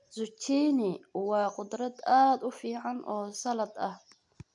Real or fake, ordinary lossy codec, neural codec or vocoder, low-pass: real; none; none; 10.8 kHz